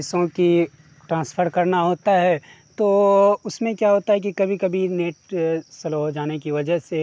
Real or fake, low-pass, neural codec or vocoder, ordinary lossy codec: real; none; none; none